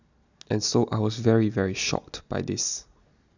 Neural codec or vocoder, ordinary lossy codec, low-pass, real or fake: none; none; 7.2 kHz; real